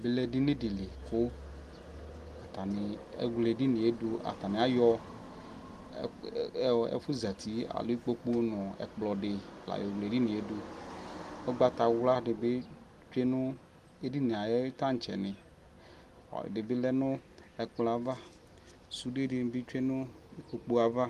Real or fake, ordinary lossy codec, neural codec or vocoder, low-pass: real; Opus, 24 kbps; none; 14.4 kHz